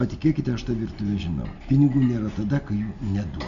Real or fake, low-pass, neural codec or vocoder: real; 7.2 kHz; none